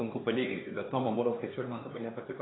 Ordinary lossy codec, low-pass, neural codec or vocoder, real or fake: AAC, 16 kbps; 7.2 kHz; codec, 16 kHz, 2 kbps, X-Codec, HuBERT features, trained on LibriSpeech; fake